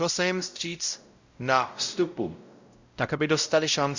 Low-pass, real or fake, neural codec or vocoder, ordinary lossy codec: 7.2 kHz; fake; codec, 16 kHz, 0.5 kbps, X-Codec, WavLM features, trained on Multilingual LibriSpeech; Opus, 64 kbps